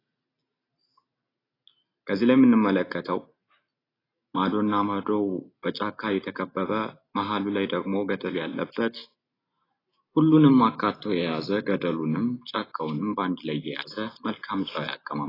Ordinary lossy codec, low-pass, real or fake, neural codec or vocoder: AAC, 24 kbps; 5.4 kHz; real; none